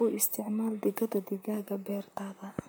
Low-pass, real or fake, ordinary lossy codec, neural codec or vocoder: none; fake; none; vocoder, 44.1 kHz, 128 mel bands, Pupu-Vocoder